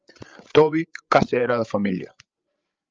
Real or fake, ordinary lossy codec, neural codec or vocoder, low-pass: fake; Opus, 24 kbps; codec, 16 kHz, 16 kbps, FreqCodec, larger model; 7.2 kHz